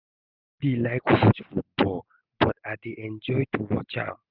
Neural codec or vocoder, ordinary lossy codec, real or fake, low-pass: none; Opus, 64 kbps; real; 5.4 kHz